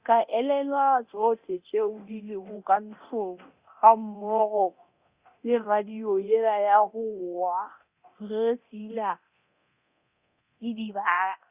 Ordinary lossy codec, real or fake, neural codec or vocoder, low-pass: Opus, 64 kbps; fake; codec, 24 kHz, 0.9 kbps, DualCodec; 3.6 kHz